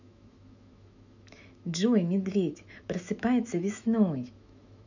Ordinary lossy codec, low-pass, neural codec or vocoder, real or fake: none; 7.2 kHz; autoencoder, 48 kHz, 128 numbers a frame, DAC-VAE, trained on Japanese speech; fake